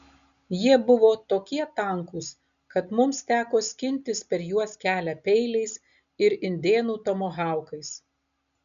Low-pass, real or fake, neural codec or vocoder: 7.2 kHz; real; none